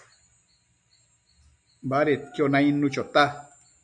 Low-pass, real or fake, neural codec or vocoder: 9.9 kHz; real; none